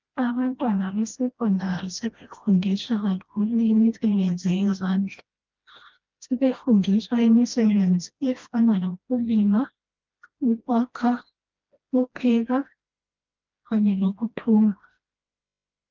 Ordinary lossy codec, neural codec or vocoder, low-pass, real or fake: Opus, 24 kbps; codec, 16 kHz, 1 kbps, FreqCodec, smaller model; 7.2 kHz; fake